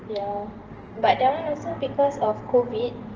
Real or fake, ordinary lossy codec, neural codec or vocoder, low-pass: fake; Opus, 16 kbps; vocoder, 44.1 kHz, 128 mel bands every 512 samples, BigVGAN v2; 7.2 kHz